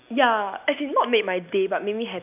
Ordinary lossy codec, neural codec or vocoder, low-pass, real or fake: none; none; 3.6 kHz; real